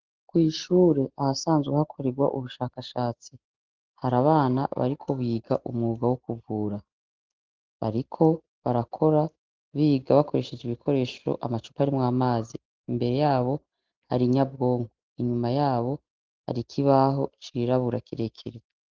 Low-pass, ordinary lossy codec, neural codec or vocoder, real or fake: 7.2 kHz; Opus, 16 kbps; none; real